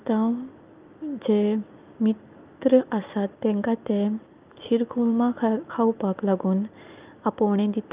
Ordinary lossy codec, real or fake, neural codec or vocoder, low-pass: Opus, 24 kbps; real; none; 3.6 kHz